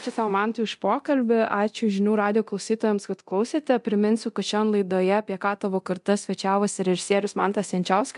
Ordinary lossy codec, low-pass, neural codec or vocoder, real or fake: MP3, 64 kbps; 10.8 kHz; codec, 24 kHz, 0.9 kbps, DualCodec; fake